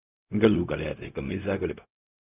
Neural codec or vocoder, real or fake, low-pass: codec, 16 kHz, 0.4 kbps, LongCat-Audio-Codec; fake; 3.6 kHz